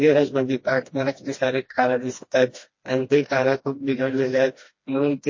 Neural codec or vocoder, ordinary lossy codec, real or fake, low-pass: codec, 16 kHz, 1 kbps, FreqCodec, smaller model; MP3, 32 kbps; fake; 7.2 kHz